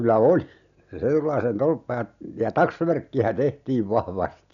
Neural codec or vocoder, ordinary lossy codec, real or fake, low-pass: none; none; real; 7.2 kHz